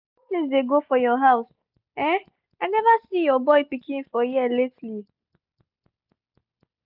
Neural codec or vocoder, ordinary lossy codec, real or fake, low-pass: none; none; real; 5.4 kHz